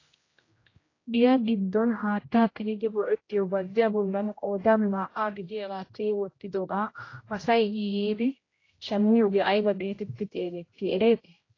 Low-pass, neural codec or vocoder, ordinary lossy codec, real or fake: 7.2 kHz; codec, 16 kHz, 0.5 kbps, X-Codec, HuBERT features, trained on general audio; AAC, 32 kbps; fake